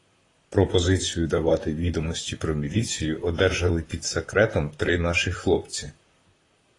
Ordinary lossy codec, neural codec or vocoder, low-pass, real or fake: AAC, 32 kbps; vocoder, 44.1 kHz, 128 mel bands, Pupu-Vocoder; 10.8 kHz; fake